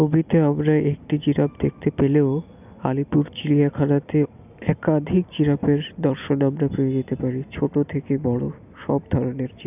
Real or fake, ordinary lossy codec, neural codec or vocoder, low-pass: real; none; none; 3.6 kHz